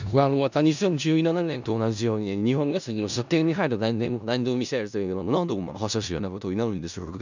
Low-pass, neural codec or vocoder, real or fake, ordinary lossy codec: 7.2 kHz; codec, 16 kHz in and 24 kHz out, 0.4 kbps, LongCat-Audio-Codec, four codebook decoder; fake; none